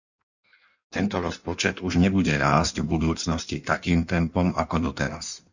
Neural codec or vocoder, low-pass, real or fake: codec, 16 kHz in and 24 kHz out, 1.1 kbps, FireRedTTS-2 codec; 7.2 kHz; fake